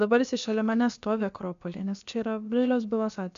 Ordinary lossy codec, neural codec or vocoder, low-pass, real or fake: AAC, 64 kbps; codec, 16 kHz, about 1 kbps, DyCAST, with the encoder's durations; 7.2 kHz; fake